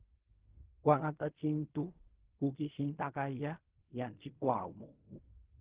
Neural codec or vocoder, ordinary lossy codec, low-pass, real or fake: codec, 16 kHz in and 24 kHz out, 0.4 kbps, LongCat-Audio-Codec, fine tuned four codebook decoder; Opus, 16 kbps; 3.6 kHz; fake